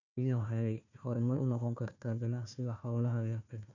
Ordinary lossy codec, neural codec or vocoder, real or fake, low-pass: none; codec, 16 kHz, 1 kbps, FunCodec, trained on Chinese and English, 50 frames a second; fake; 7.2 kHz